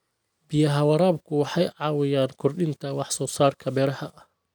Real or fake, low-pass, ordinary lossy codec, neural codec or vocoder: real; none; none; none